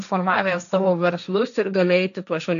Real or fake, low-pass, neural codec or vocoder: fake; 7.2 kHz; codec, 16 kHz, 1.1 kbps, Voila-Tokenizer